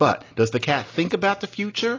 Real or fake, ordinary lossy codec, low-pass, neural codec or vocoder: real; AAC, 32 kbps; 7.2 kHz; none